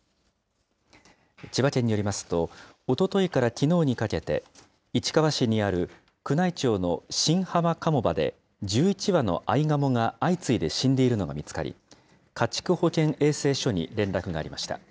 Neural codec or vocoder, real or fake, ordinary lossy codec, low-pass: none; real; none; none